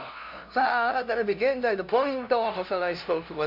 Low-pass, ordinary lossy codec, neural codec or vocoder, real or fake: 5.4 kHz; MP3, 48 kbps; codec, 16 kHz, 0.5 kbps, FunCodec, trained on LibriTTS, 25 frames a second; fake